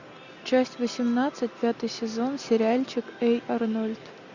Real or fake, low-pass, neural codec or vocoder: real; 7.2 kHz; none